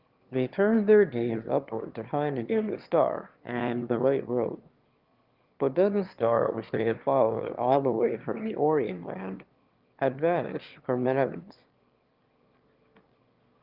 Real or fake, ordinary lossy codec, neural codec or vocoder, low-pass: fake; Opus, 32 kbps; autoencoder, 22.05 kHz, a latent of 192 numbers a frame, VITS, trained on one speaker; 5.4 kHz